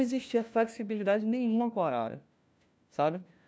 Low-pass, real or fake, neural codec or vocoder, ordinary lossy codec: none; fake; codec, 16 kHz, 1 kbps, FunCodec, trained on LibriTTS, 50 frames a second; none